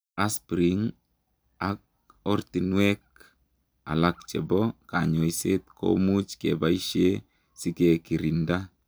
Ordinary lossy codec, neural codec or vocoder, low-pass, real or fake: none; none; none; real